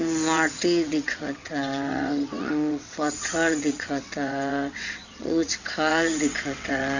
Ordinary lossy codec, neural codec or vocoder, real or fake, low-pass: none; vocoder, 22.05 kHz, 80 mel bands, WaveNeXt; fake; 7.2 kHz